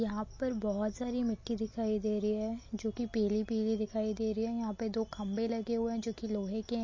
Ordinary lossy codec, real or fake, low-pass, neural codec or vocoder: MP3, 32 kbps; real; 7.2 kHz; none